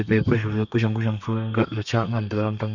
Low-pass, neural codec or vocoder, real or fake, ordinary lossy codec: 7.2 kHz; codec, 32 kHz, 1.9 kbps, SNAC; fake; none